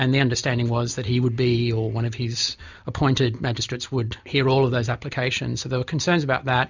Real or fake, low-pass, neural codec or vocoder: real; 7.2 kHz; none